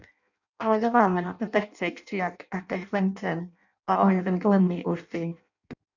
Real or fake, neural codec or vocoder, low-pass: fake; codec, 16 kHz in and 24 kHz out, 0.6 kbps, FireRedTTS-2 codec; 7.2 kHz